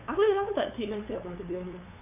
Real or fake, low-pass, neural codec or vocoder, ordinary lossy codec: fake; 3.6 kHz; codec, 16 kHz, 8 kbps, FunCodec, trained on LibriTTS, 25 frames a second; AAC, 32 kbps